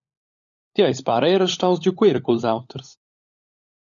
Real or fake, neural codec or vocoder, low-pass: fake; codec, 16 kHz, 16 kbps, FunCodec, trained on LibriTTS, 50 frames a second; 7.2 kHz